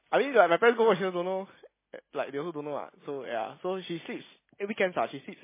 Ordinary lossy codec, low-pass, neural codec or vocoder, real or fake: MP3, 16 kbps; 3.6 kHz; none; real